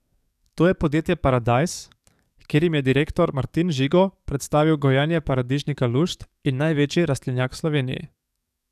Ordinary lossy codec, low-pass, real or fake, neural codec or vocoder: none; 14.4 kHz; fake; codec, 44.1 kHz, 7.8 kbps, DAC